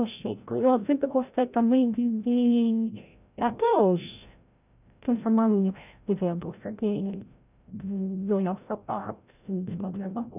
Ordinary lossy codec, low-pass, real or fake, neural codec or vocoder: none; 3.6 kHz; fake; codec, 16 kHz, 0.5 kbps, FreqCodec, larger model